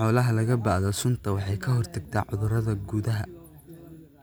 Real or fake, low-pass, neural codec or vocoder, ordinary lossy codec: real; none; none; none